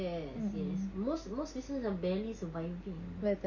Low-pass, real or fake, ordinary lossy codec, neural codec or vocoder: 7.2 kHz; real; none; none